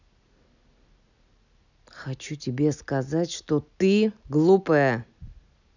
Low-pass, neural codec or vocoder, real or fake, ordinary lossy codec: 7.2 kHz; none; real; none